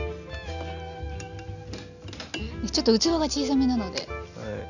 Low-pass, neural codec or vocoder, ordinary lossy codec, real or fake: 7.2 kHz; none; none; real